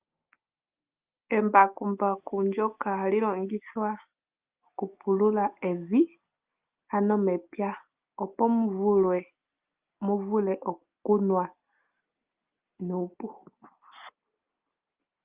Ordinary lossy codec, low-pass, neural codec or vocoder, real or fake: Opus, 32 kbps; 3.6 kHz; none; real